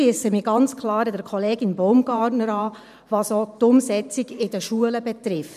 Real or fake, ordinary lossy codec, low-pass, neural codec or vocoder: fake; none; 14.4 kHz; vocoder, 44.1 kHz, 128 mel bands every 512 samples, BigVGAN v2